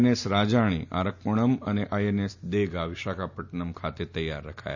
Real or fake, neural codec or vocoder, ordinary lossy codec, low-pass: real; none; none; 7.2 kHz